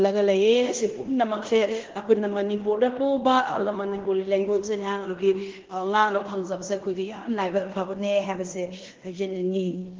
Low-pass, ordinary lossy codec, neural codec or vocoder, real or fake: 7.2 kHz; Opus, 16 kbps; codec, 16 kHz in and 24 kHz out, 0.9 kbps, LongCat-Audio-Codec, fine tuned four codebook decoder; fake